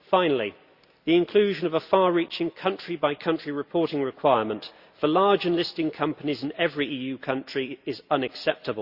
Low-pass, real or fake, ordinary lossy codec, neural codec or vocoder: 5.4 kHz; real; Opus, 64 kbps; none